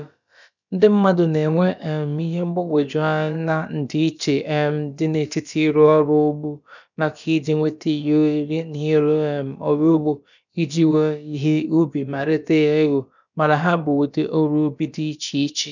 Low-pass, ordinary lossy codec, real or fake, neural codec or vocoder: 7.2 kHz; none; fake; codec, 16 kHz, about 1 kbps, DyCAST, with the encoder's durations